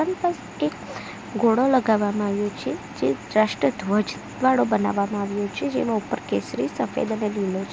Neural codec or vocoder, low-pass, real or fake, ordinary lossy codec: none; none; real; none